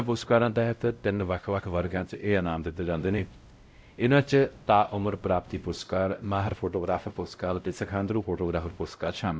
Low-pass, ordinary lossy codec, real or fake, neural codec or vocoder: none; none; fake; codec, 16 kHz, 0.5 kbps, X-Codec, WavLM features, trained on Multilingual LibriSpeech